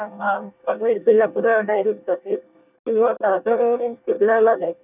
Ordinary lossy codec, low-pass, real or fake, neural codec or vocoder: none; 3.6 kHz; fake; codec, 24 kHz, 1 kbps, SNAC